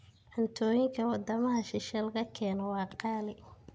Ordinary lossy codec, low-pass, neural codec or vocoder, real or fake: none; none; none; real